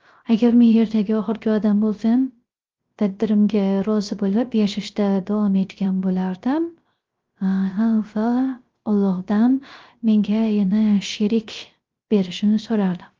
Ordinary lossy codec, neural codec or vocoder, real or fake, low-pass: Opus, 32 kbps; codec, 16 kHz, 0.3 kbps, FocalCodec; fake; 7.2 kHz